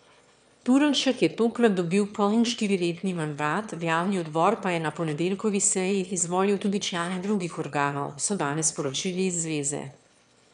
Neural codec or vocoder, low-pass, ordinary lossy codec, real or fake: autoencoder, 22.05 kHz, a latent of 192 numbers a frame, VITS, trained on one speaker; 9.9 kHz; none; fake